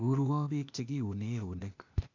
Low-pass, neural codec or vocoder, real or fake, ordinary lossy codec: 7.2 kHz; codec, 16 kHz, 0.8 kbps, ZipCodec; fake; none